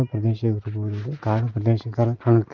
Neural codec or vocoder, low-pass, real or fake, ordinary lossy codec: none; 7.2 kHz; real; Opus, 32 kbps